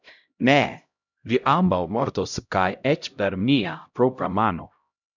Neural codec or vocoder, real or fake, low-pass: codec, 16 kHz, 0.5 kbps, X-Codec, HuBERT features, trained on LibriSpeech; fake; 7.2 kHz